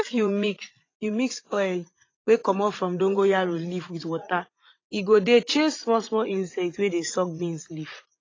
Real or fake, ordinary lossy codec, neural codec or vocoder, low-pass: fake; AAC, 32 kbps; vocoder, 44.1 kHz, 80 mel bands, Vocos; 7.2 kHz